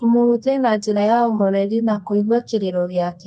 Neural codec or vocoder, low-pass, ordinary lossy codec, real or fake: codec, 24 kHz, 0.9 kbps, WavTokenizer, medium music audio release; 10.8 kHz; none; fake